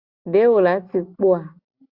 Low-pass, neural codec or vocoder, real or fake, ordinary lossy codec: 5.4 kHz; none; real; Opus, 64 kbps